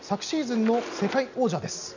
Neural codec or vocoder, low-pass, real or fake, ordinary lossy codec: none; 7.2 kHz; real; none